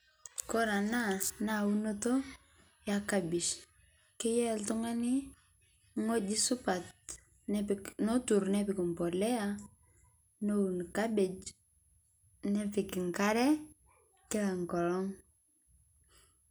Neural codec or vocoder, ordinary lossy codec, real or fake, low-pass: none; none; real; none